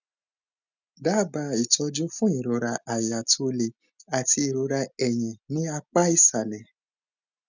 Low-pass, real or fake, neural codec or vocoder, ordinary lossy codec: 7.2 kHz; real; none; none